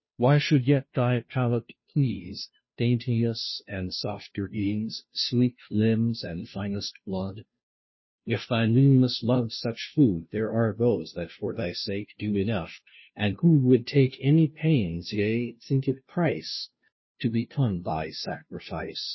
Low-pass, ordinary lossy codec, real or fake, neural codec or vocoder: 7.2 kHz; MP3, 24 kbps; fake; codec, 16 kHz, 0.5 kbps, FunCodec, trained on Chinese and English, 25 frames a second